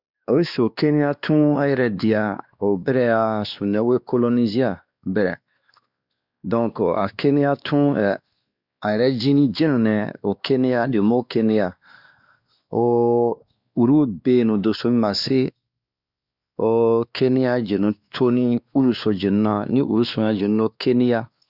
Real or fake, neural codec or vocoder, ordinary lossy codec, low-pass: fake; codec, 16 kHz, 2 kbps, X-Codec, WavLM features, trained on Multilingual LibriSpeech; Opus, 64 kbps; 5.4 kHz